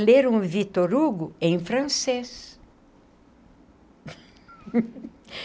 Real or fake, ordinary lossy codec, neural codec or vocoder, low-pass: real; none; none; none